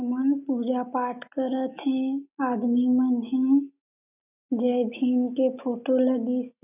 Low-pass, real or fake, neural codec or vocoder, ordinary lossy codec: 3.6 kHz; real; none; none